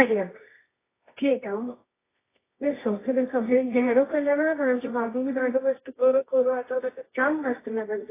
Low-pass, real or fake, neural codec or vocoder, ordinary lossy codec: 3.6 kHz; fake; codec, 24 kHz, 0.9 kbps, WavTokenizer, medium music audio release; AAC, 16 kbps